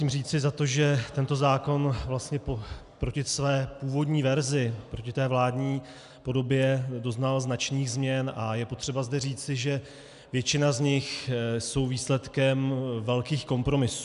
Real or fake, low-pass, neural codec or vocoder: real; 10.8 kHz; none